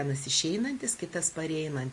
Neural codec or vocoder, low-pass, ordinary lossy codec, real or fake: none; 10.8 kHz; MP3, 48 kbps; real